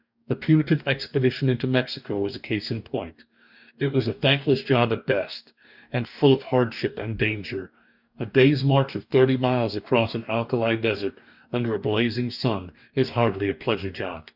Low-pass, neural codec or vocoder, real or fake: 5.4 kHz; codec, 44.1 kHz, 2.6 kbps, DAC; fake